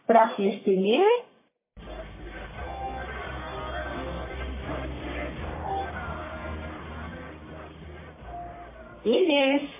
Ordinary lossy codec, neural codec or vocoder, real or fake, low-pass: MP3, 16 kbps; codec, 44.1 kHz, 1.7 kbps, Pupu-Codec; fake; 3.6 kHz